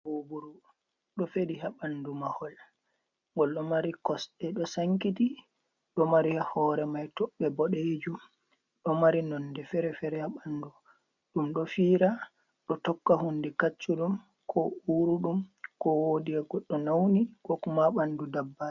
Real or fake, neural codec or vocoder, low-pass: real; none; 7.2 kHz